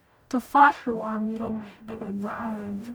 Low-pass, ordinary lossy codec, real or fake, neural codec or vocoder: none; none; fake; codec, 44.1 kHz, 0.9 kbps, DAC